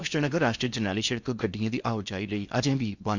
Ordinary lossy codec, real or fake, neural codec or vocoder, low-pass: none; fake; codec, 16 kHz in and 24 kHz out, 0.8 kbps, FocalCodec, streaming, 65536 codes; 7.2 kHz